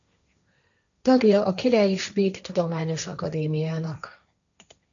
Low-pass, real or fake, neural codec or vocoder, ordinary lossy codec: 7.2 kHz; fake; codec, 16 kHz, 1.1 kbps, Voila-Tokenizer; MP3, 96 kbps